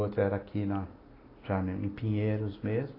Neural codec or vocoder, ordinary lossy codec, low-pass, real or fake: none; AAC, 24 kbps; 5.4 kHz; real